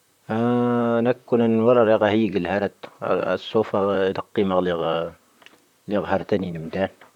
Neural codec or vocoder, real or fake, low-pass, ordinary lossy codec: vocoder, 44.1 kHz, 128 mel bands, Pupu-Vocoder; fake; 19.8 kHz; none